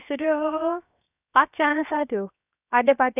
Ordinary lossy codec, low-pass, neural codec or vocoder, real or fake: none; 3.6 kHz; codec, 16 kHz, 0.7 kbps, FocalCodec; fake